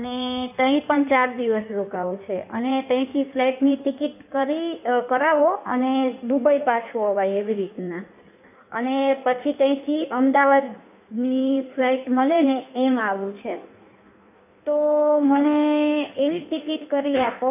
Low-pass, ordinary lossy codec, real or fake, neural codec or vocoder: 3.6 kHz; none; fake; codec, 16 kHz in and 24 kHz out, 1.1 kbps, FireRedTTS-2 codec